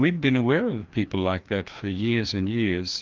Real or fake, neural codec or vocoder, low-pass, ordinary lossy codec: fake; codec, 16 kHz, 2 kbps, FreqCodec, larger model; 7.2 kHz; Opus, 24 kbps